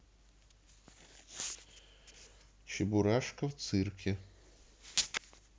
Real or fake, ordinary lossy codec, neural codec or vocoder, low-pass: real; none; none; none